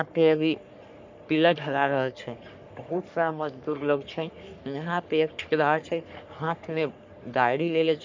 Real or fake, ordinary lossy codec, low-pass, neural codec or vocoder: fake; MP3, 64 kbps; 7.2 kHz; codec, 44.1 kHz, 3.4 kbps, Pupu-Codec